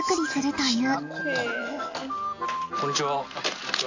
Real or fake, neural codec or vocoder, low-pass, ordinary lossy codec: real; none; 7.2 kHz; AAC, 48 kbps